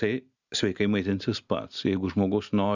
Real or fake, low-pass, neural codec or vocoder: real; 7.2 kHz; none